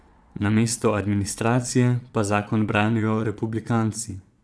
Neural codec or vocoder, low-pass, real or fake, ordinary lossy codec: vocoder, 22.05 kHz, 80 mel bands, Vocos; none; fake; none